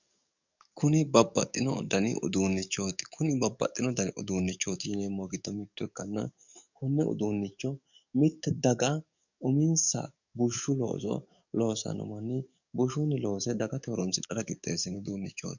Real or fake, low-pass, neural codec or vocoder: fake; 7.2 kHz; codec, 44.1 kHz, 7.8 kbps, DAC